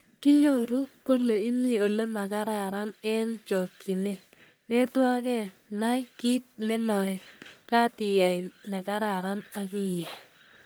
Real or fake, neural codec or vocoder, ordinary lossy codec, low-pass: fake; codec, 44.1 kHz, 1.7 kbps, Pupu-Codec; none; none